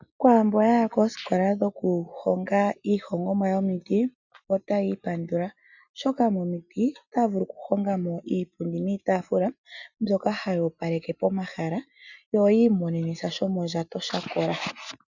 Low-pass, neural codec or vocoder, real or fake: 7.2 kHz; none; real